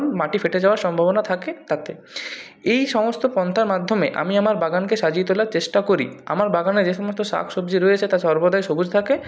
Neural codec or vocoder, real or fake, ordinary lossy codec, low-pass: none; real; none; none